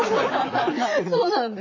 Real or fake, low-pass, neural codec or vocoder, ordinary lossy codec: fake; 7.2 kHz; vocoder, 44.1 kHz, 128 mel bands, Pupu-Vocoder; MP3, 48 kbps